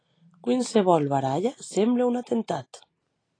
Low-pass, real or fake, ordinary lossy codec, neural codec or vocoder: 9.9 kHz; real; AAC, 32 kbps; none